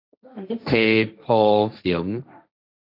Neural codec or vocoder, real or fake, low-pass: codec, 16 kHz, 1.1 kbps, Voila-Tokenizer; fake; 5.4 kHz